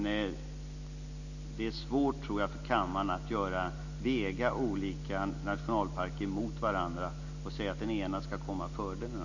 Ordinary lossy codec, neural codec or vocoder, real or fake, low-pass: none; none; real; 7.2 kHz